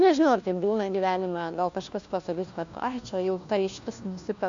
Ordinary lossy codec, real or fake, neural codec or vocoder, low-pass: AAC, 64 kbps; fake; codec, 16 kHz, 1 kbps, FunCodec, trained on LibriTTS, 50 frames a second; 7.2 kHz